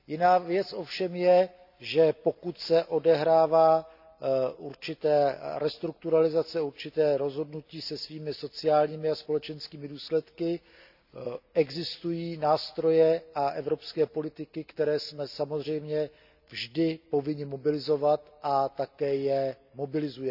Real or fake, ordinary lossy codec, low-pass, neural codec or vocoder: real; none; 5.4 kHz; none